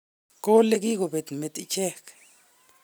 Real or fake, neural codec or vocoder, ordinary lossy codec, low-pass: real; none; none; none